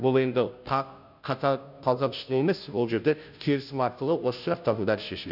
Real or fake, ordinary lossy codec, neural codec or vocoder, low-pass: fake; none; codec, 16 kHz, 0.5 kbps, FunCodec, trained on Chinese and English, 25 frames a second; 5.4 kHz